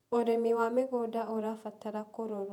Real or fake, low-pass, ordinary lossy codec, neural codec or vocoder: fake; 19.8 kHz; none; vocoder, 48 kHz, 128 mel bands, Vocos